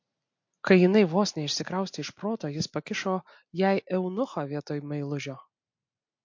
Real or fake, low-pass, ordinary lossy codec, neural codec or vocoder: real; 7.2 kHz; MP3, 48 kbps; none